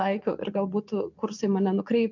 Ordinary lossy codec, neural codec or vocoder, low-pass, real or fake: MP3, 64 kbps; none; 7.2 kHz; real